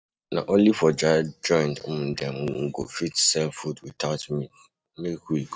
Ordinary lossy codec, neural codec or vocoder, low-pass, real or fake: none; none; none; real